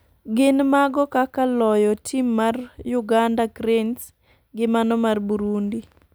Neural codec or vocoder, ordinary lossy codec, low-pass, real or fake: none; none; none; real